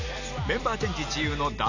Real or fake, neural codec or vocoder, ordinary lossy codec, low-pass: real; none; none; 7.2 kHz